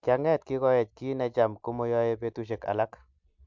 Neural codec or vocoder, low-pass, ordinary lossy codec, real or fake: none; 7.2 kHz; MP3, 64 kbps; real